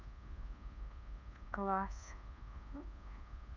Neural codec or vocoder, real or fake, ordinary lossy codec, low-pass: codec, 24 kHz, 1.2 kbps, DualCodec; fake; none; 7.2 kHz